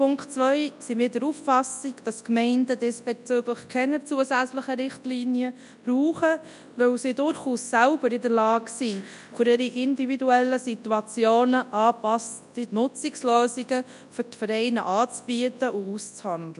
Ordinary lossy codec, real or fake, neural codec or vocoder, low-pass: none; fake; codec, 24 kHz, 0.9 kbps, WavTokenizer, large speech release; 10.8 kHz